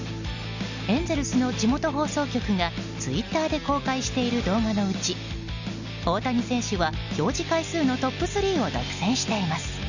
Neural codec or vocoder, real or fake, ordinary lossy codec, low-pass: none; real; none; 7.2 kHz